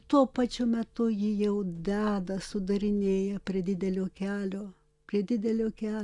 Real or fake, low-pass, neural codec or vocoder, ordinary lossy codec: real; 10.8 kHz; none; Opus, 64 kbps